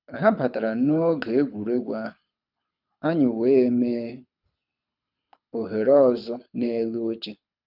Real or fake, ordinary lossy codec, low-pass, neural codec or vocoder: fake; none; 5.4 kHz; codec, 24 kHz, 6 kbps, HILCodec